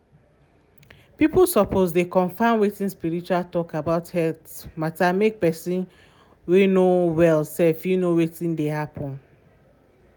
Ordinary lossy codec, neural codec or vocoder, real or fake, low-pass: none; none; real; none